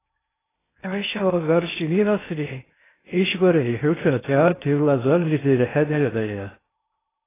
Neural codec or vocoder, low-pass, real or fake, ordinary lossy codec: codec, 16 kHz in and 24 kHz out, 0.6 kbps, FocalCodec, streaming, 2048 codes; 3.6 kHz; fake; AAC, 16 kbps